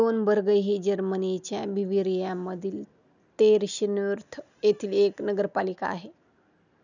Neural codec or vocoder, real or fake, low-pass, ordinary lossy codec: none; real; 7.2 kHz; none